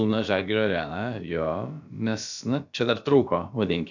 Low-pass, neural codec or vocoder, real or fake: 7.2 kHz; codec, 16 kHz, about 1 kbps, DyCAST, with the encoder's durations; fake